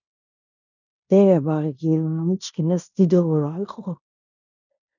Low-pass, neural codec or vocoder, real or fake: 7.2 kHz; codec, 16 kHz in and 24 kHz out, 0.9 kbps, LongCat-Audio-Codec, fine tuned four codebook decoder; fake